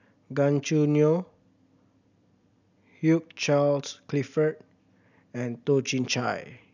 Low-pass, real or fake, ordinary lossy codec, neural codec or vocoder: 7.2 kHz; real; none; none